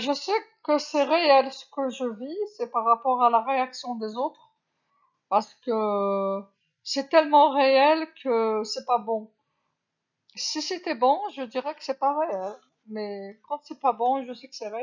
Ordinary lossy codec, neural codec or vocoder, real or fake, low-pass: none; none; real; 7.2 kHz